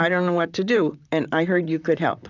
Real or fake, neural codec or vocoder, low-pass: fake; vocoder, 44.1 kHz, 128 mel bands, Pupu-Vocoder; 7.2 kHz